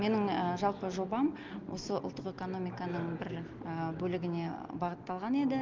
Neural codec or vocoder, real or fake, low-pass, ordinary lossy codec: none; real; 7.2 kHz; Opus, 32 kbps